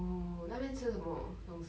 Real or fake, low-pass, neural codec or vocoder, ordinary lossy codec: real; none; none; none